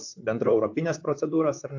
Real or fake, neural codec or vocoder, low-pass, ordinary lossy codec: fake; vocoder, 44.1 kHz, 128 mel bands, Pupu-Vocoder; 7.2 kHz; AAC, 48 kbps